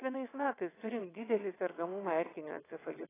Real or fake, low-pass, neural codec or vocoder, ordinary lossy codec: fake; 3.6 kHz; vocoder, 22.05 kHz, 80 mel bands, WaveNeXt; AAC, 16 kbps